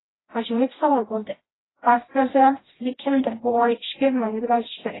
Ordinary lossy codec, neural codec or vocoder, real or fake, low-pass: AAC, 16 kbps; codec, 16 kHz, 1 kbps, FreqCodec, smaller model; fake; 7.2 kHz